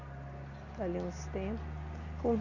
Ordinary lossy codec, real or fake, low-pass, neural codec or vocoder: none; real; 7.2 kHz; none